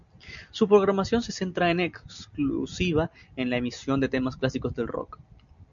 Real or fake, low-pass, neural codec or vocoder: real; 7.2 kHz; none